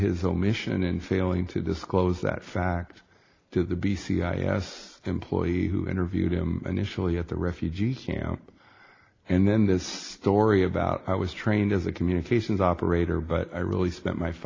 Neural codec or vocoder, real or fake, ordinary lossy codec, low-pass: none; real; AAC, 32 kbps; 7.2 kHz